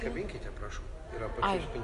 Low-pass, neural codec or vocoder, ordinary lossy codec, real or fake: 10.8 kHz; none; Opus, 64 kbps; real